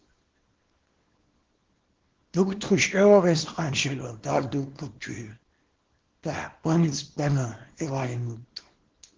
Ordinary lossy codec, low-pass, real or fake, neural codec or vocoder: Opus, 16 kbps; 7.2 kHz; fake; codec, 24 kHz, 0.9 kbps, WavTokenizer, small release